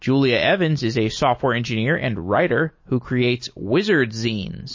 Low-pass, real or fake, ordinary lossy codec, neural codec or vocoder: 7.2 kHz; real; MP3, 32 kbps; none